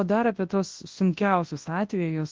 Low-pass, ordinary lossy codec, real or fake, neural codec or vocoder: 7.2 kHz; Opus, 16 kbps; fake; codec, 24 kHz, 0.9 kbps, WavTokenizer, large speech release